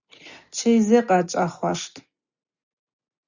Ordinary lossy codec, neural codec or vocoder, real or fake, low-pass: Opus, 64 kbps; none; real; 7.2 kHz